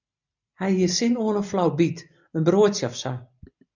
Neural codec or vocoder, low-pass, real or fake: none; 7.2 kHz; real